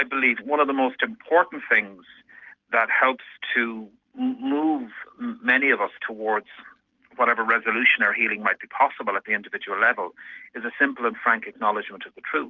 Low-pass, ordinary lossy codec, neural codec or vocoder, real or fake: 7.2 kHz; Opus, 24 kbps; none; real